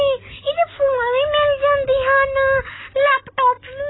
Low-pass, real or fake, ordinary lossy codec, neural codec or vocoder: 7.2 kHz; real; AAC, 16 kbps; none